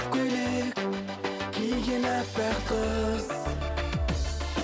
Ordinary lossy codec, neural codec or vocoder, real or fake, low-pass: none; none; real; none